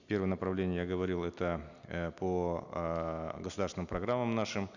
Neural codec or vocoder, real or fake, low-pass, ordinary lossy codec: none; real; 7.2 kHz; none